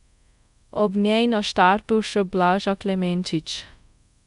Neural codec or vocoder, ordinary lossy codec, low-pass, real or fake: codec, 24 kHz, 0.5 kbps, DualCodec; none; 10.8 kHz; fake